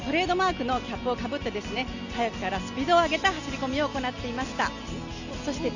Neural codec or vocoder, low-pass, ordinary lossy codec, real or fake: none; 7.2 kHz; none; real